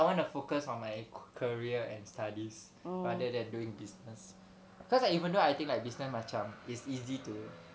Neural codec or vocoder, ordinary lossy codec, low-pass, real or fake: none; none; none; real